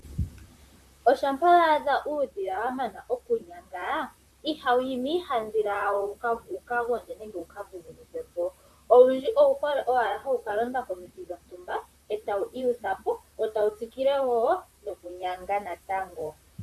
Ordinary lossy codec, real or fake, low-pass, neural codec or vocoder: MP3, 96 kbps; fake; 14.4 kHz; vocoder, 44.1 kHz, 128 mel bands, Pupu-Vocoder